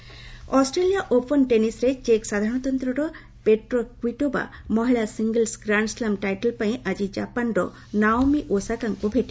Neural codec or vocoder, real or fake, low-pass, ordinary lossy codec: none; real; none; none